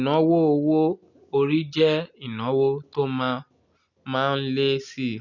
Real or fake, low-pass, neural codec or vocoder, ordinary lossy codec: real; 7.2 kHz; none; none